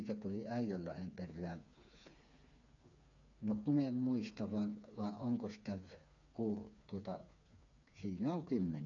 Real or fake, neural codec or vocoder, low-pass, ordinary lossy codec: fake; codec, 16 kHz, 4 kbps, FreqCodec, smaller model; 7.2 kHz; none